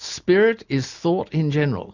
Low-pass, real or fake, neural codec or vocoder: 7.2 kHz; real; none